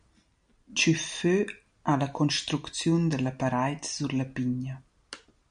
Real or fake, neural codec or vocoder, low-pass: real; none; 9.9 kHz